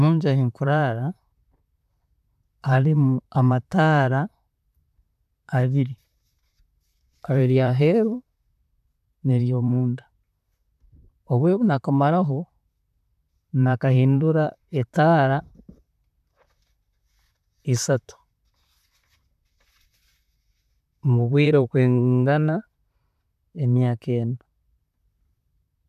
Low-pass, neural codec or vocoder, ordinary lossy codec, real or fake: 14.4 kHz; vocoder, 44.1 kHz, 128 mel bands, Pupu-Vocoder; none; fake